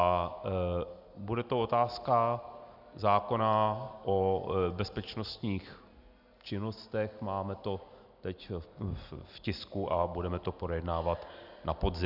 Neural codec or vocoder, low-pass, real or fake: none; 5.4 kHz; real